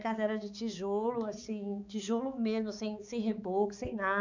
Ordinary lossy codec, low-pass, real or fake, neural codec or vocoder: none; 7.2 kHz; fake; codec, 16 kHz, 4 kbps, X-Codec, HuBERT features, trained on balanced general audio